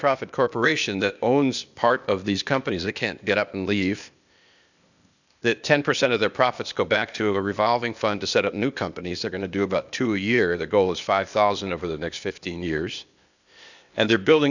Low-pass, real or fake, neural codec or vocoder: 7.2 kHz; fake; codec, 16 kHz, 0.8 kbps, ZipCodec